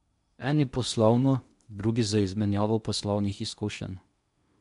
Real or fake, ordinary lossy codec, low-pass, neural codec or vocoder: fake; MP3, 64 kbps; 10.8 kHz; codec, 16 kHz in and 24 kHz out, 0.8 kbps, FocalCodec, streaming, 65536 codes